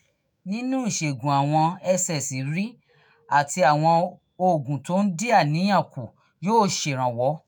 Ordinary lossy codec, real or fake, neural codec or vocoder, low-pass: none; fake; autoencoder, 48 kHz, 128 numbers a frame, DAC-VAE, trained on Japanese speech; none